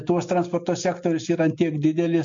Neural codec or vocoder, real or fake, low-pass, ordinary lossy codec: none; real; 7.2 kHz; MP3, 48 kbps